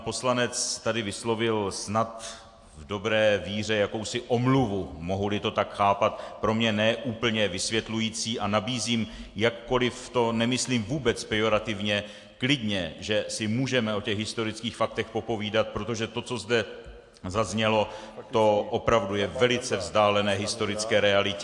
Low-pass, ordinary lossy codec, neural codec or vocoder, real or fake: 10.8 kHz; AAC, 64 kbps; none; real